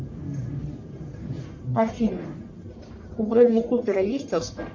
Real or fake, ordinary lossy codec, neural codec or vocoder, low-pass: fake; MP3, 48 kbps; codec, 44.1 kHz, 1.7 kbps, Pupu-Codec; 7.2 kHz